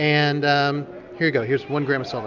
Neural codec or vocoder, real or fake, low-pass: none; real; 7.2 kHz